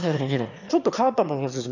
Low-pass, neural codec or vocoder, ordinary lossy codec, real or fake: 7.2 kHz; autoencoder, 22.05 kHz, a latent of 192 numbers a frame, VITS, trained on one speaker; none; fake